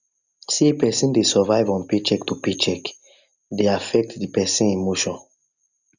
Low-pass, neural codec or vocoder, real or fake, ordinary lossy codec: 7.2 kHz; none; real; none